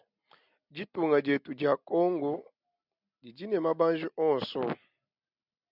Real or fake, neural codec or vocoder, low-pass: real; none; 5.4 kHz